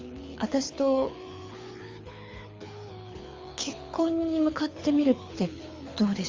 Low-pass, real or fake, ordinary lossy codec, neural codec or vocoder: 7.2 kHz; fake; Opus, 32 kbps; codec, 24 kHz, 6 kbps, HILCodec